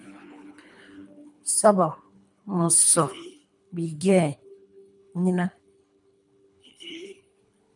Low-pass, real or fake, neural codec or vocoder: 10.8 kHz; fake; codec, 24 kHz, 3 kbps, HILCodec